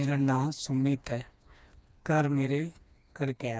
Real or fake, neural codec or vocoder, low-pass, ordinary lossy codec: fake; codec, 16 kHz, 2 kbps, FreqCodec, smaller model; none; none